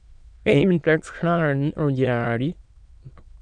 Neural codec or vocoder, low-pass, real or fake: autoencoder, 22.05 kHz, a latent of 192 numbers a frame, VITS, trained on many speakers; 9.9 kHz; fake